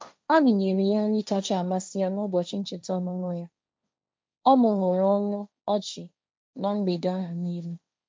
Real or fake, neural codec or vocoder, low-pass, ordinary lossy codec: fake; codec, 16 kHz, 1.1 kbps, Voila-Tokenizer; none; none